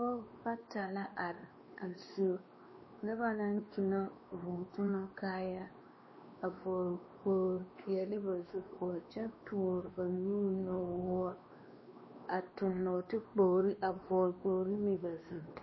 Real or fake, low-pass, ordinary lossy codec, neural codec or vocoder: fake; 7.2 kHz; MP3, 24 kbps; codec, 16 kHz, 2 kbps, X-Codec, WavLM features, trained on Multilingual LibriSpeech